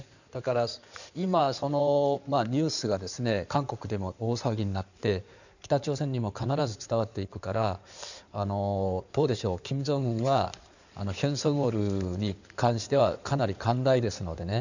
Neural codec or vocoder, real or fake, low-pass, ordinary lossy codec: codec, 16 kHz in and 24 kHz out, 2.2 kbps, FireRedTTS-2 codec; fake; 7.2 kHz; none